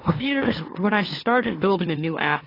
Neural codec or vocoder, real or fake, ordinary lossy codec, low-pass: autoencoder, 44.1 kHz, a latent of 192 numbers a frame, MeloTTS; fake; AAC, 24 kbps; 5.4 kHz